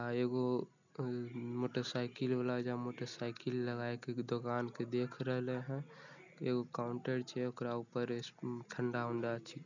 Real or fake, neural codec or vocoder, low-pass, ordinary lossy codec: real; none; 7.2 kHz; none